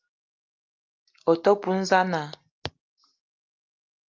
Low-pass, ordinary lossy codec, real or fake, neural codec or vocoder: 7.2 kHz; Opus, 24 kbps; real; none